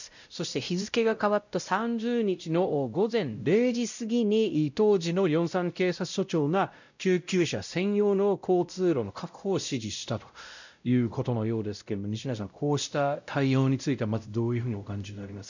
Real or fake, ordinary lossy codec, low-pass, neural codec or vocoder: fake; none; 7.2 kHz; codec, 16 kHz, 0.5 kbps, X-Codec, WavLM features, trained on Multilingual LibriSpeech